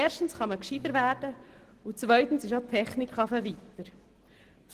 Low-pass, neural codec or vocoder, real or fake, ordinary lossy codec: 14.4 kHz; none; real; Opus, 16 kbps